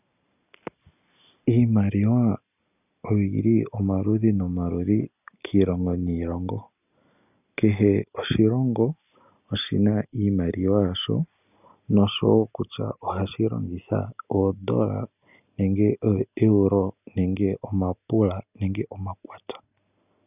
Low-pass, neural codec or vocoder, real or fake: 3.6 kHz; none; real